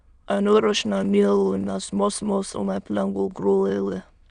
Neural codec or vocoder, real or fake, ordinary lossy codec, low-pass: autoencoder, 22.05 kHz, a latent of 192 numbers a frame, VITS, trained on many speakers; fake; none; 9.9 kHz